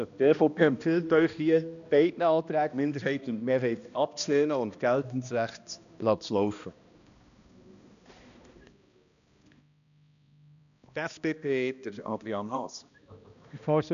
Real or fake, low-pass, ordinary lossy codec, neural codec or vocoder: fake; 7.2 kHz; none; codec, 16 kHz, 1 kbps, X-Codec, HuBERT features, trained on balanced general audio